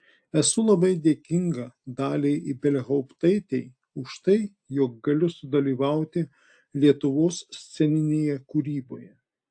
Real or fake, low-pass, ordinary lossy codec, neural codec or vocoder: real; 9.9 kHz; AAC, 64 kbps; none